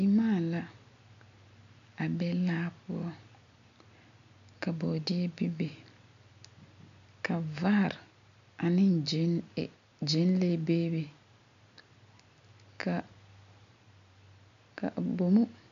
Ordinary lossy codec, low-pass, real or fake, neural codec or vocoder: MP3, 96 kbps; 7.2 kHz; real; none